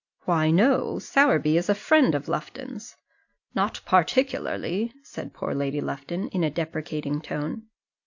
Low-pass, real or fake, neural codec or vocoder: 7.2 kHz; real; none